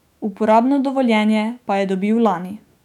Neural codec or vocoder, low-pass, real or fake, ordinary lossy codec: autoencoder, 48 kHz, 128 numbers a frame, DAC-VAE, trained on Japanese speech; 19.8 kHz; fake; none